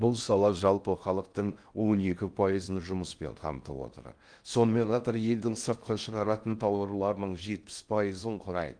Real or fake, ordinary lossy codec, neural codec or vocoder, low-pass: fake; Opus, 32 kbps; codec, 16 kHz in and 24 kHz out, 0.6 kbps, FocalCodec, streaming, 4096 codes; 9.9 kHz